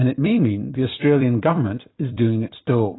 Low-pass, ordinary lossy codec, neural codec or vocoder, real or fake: 7.2 kHz; AAC, 16 kbps; vocoder, 44.1 kHz, 128 mel bands every 256 samples, BigVGAN v2; fake